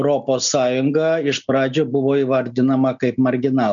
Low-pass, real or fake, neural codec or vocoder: 7.2 kHz; real; none